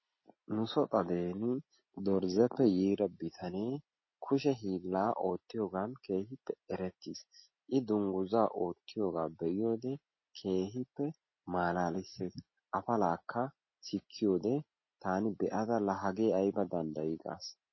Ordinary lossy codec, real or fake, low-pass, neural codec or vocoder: MP3, 24 kbps; real; 7.2 kHz; none